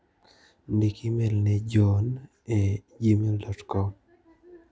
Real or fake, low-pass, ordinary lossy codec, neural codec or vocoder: real; none; none; none